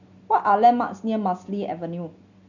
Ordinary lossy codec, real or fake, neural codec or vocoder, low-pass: none; real; none; 7.2 kHz